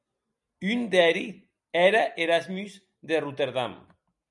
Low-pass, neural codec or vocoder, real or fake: 10.8 kHz; none; real